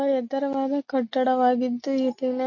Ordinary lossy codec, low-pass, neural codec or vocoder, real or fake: MP3, 32 kbps; 7.2 kHz; none; real